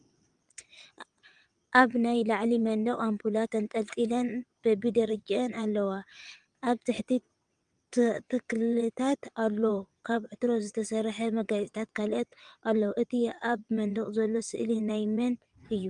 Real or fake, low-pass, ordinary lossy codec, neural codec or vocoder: fake; 9.9 kHz; Opus, 32 kbps; vocoder, 22.05 kHz, 80 mel bands, WaveNeXt